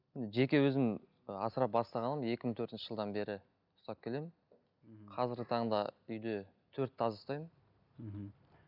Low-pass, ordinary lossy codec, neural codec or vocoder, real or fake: 5.4 kHz; none; none; real